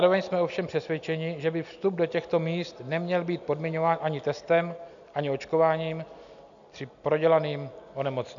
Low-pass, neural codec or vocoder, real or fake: 7.2 kHz; none; real